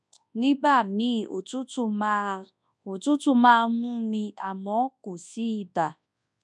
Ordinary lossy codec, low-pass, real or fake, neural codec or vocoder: none; 10.8 kHz; fake; codec, 24 kHz, 0.9 kbps, WavTokenizer, large speech release